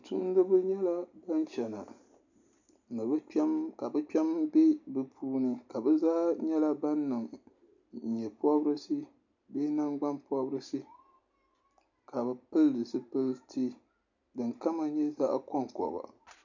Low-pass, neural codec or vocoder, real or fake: 7.2 kHz; none; real